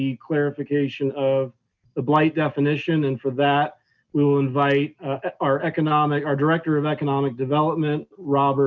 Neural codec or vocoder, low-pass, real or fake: none; 7.2 kHz; real